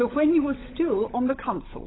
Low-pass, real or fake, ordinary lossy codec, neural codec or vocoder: 7.2 kHz; fake; AAC, 16 kbps; codec, 16 kHz, 16 kbps, FunCodec, trained on LibriTTS, 50 frames a second